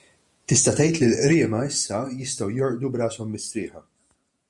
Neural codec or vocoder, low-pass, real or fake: vocoder, 48 kHz, 128 mel bands, Vocos; 10.8 kHz; fake